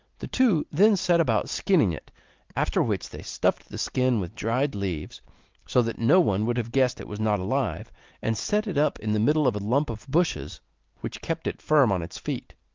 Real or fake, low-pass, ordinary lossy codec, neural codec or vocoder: real; 7.2 kHz; Opus, 24 kbps; none